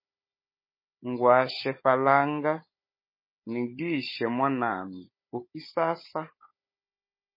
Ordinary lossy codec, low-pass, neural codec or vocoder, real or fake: MP3, 24 kbps; 5.4 kHz; codec, 16 kHz, 16 kbps, FunCodec, trained on Chinese and English, 50 frames a second; fake